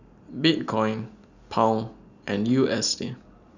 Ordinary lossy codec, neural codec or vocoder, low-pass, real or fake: none; none; 7.2 kHz; real